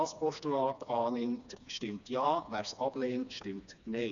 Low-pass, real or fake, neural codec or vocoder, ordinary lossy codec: 7.2 kHz; fake; codec, 16 kHz, 2 kbps, FreqCodec, smaller model; none